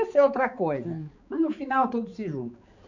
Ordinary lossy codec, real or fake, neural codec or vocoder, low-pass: none; fake; codec, 16 kHz, 4 kbps, X-Codec, HuBERT features, trained on balanced general audio; 7.2 kHz